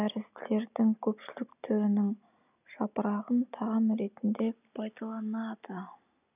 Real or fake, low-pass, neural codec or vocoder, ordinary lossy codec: real; 3.6 kHz; none; none